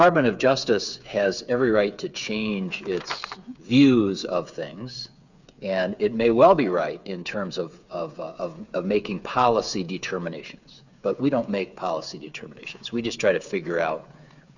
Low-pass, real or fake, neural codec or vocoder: 7.2 kHz; fake; codec, 16 kHz, 8 kbps, FreqCodec, smaller model